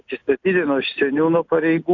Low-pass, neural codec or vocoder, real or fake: 7.2 kHz; none; real